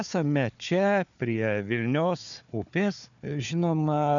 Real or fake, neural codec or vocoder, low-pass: fake; codec, 16 kHz, 4 kbps, FunCodec, trained on Chinese and English, 50 frames a second; 7.2 kHz